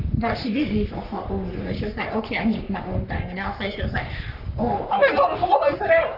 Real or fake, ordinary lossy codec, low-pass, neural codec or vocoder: fake; none; 5.4 kHz; codec, 44.1 kHz, 3.4 kbps, Pupu-Codec